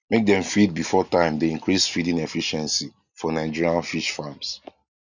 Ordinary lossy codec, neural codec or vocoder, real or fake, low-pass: none; none; real; 7.2 kHz